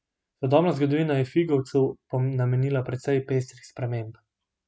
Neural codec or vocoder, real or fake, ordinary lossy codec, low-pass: none; real; none; none